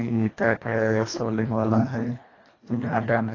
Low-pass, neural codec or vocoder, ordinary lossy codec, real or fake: 7.2 kHz; codec, 24 kHz, 1.5 kbps, HILCodec; AAC, 32 kbps; fake